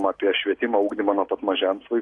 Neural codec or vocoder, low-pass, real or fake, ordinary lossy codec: none; 10.8 kHz; real; AAC, 48 kbps